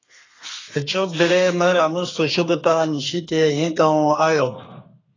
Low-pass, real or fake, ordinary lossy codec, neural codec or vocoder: 7.2 kHz; fake; AAC, 32 kbps; codec, 32 kHz, 1.9 kbps, SNAC